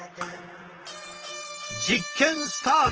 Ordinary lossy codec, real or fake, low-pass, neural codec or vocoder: Opus, 16 kbps; fake; 7.2 kHz; vocoder, 44.1 kHz, 128 mel bands, Pupu-Vocoder